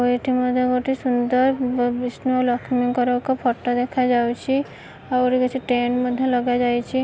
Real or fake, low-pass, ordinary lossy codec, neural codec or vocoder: real; none; none; none